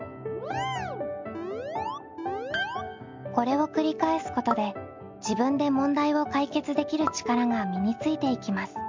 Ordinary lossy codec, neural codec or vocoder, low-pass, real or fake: MP3, 64 kbps; none; 7.2 kHz; real